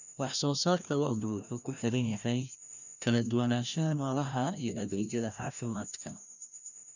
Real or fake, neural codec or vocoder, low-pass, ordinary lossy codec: fake; codec, 16 kHz, 1 kbps, FreqCodec, larger model; 7.2 kHz; none